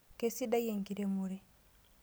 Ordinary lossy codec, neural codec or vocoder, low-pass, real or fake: none; none; none; real